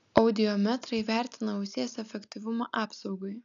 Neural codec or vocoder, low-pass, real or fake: none; 7.2 kHz; real